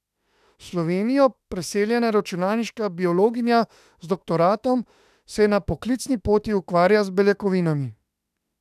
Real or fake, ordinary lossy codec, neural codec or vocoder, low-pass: fake; none; autoencoder, 48 kHz, 32 numbers a frame, DAC-VAE, trained on Japanese speech; 14.4 kHz